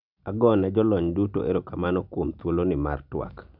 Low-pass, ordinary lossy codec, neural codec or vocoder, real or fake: 5.4 kHz; none; none; real